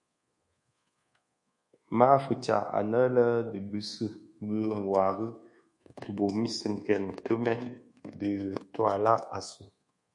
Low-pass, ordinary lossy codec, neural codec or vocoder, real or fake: 10.8 kHz; MP3, 48 kbps; codec, 24 kHz, 1.2 kbps, DualCodec; fake